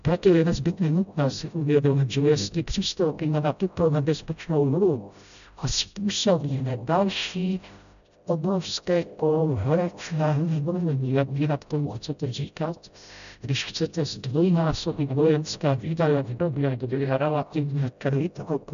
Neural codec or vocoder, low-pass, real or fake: codec, 16 kHz, 0.5 kbps, FreqCodec, smaller model; 7.2 kHz; fake